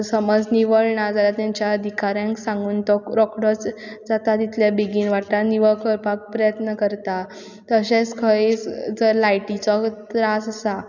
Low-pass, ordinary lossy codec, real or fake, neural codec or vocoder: 7.2 kHz; none; real; none